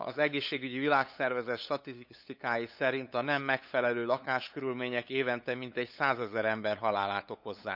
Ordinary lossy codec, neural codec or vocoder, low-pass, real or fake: none; codec, 16 kHz, 8 kbps, FunCodec, trained on LibriTTS, 25 frames a second; 5.4 kHz; fake